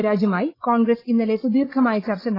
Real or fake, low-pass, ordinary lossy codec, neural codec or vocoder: fake; 5.4 kHz; AAC, 24 kbps; codec, 16 kHz, 16 kbps, FreqCodec, larger model